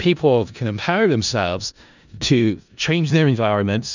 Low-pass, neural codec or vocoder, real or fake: 7.2 kHz; codec, 16 kHz in and 24 kHz out, 0.4 kbps, LongCat-Audio-Codec, four codebook decoder; fake